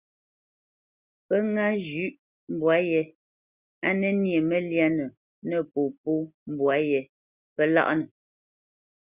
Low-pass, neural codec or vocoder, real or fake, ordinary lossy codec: 3.6 kHz; none; real; Opus, 64 kbps